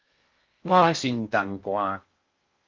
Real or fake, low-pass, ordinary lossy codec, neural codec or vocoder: fake; 7.2 kHz; Opus, 32 kbps; codec, 16 kHz in and 24 kHz out, 0.6 kbps, FocalCodec, streaming, 4096 codes